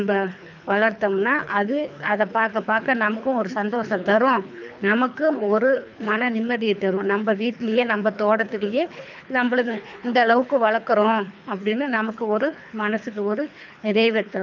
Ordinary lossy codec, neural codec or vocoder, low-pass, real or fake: none; codec, 24 kHz, 3 kbps, HILCodec; 7.2 kHz; fake